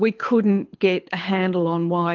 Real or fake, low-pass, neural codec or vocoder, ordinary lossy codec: fake; 7.2 kHz; codec, 24 kHz, 6 kbps, HILCodec; Opus, 32 kbps